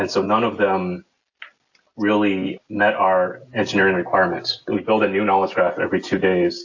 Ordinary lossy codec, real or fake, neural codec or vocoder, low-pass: AAC, 48 kbps; real; none; 7.2 kHz